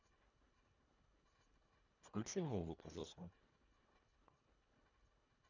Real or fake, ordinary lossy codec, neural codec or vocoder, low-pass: fake; none; codec, 24 kHz, 1.5 kbps, HILCodec; 7.2 kHz